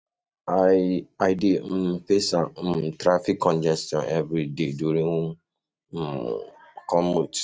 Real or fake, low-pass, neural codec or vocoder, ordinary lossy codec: real; none; none; none